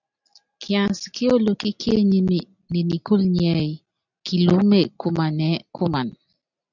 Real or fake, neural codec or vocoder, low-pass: real; none; 7.2 kHz